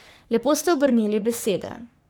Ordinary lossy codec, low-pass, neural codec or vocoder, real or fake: none; none; codec, 44.1 kHz, 3.4 kbps, Pupu-Codec; fake